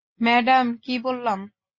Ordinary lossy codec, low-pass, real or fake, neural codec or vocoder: MP3, 32 kbps; 7.2 kHz; real; none